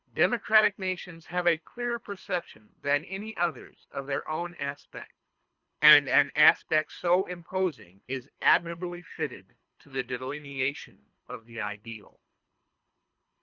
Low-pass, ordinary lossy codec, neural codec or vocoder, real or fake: 7.2 kHz; Opus, 64 kbps; codec, 24 kHz, 3 kbps, HILCodec; fake